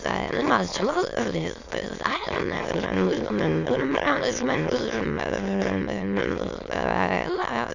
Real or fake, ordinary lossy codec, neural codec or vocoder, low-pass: fake; none; autoencoder, 22.05 kHz, a latent of 192 numbers a frame, VITS, trained on many speakers; 7.2 kHz